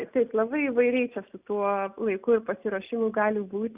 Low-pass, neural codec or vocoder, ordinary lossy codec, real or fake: 3.6 kHz; none; Opus, 64 kbps; real